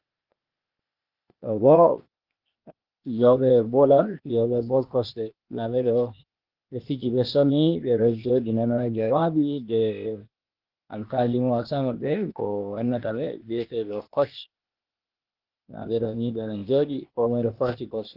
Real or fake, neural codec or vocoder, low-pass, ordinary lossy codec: fake; codec, 16 kHz, 0.8 kbps, ZipCodec; 5.4 kHz; Opus, 32 kbps